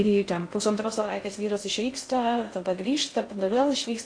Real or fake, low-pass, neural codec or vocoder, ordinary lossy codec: fake; 9.9 kHz; codec, 16 kHz in and 24 kHz out, 0.6 kbps, FocalCodec, streaming, 2048 codes; AAC, 48 kbps